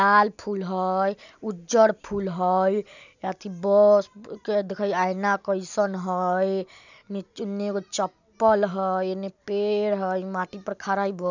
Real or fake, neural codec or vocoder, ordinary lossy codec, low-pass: real; none; none; 7.2 kHz